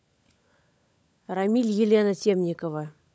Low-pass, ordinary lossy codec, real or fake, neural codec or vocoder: none; none; fake; codec, 16 kHz, 16 kbps, FunCodec, trained on LibriTTS, 50 frames a second